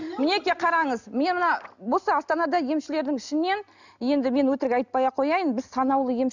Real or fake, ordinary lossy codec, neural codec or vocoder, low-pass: real; none; none; 7.2 kHz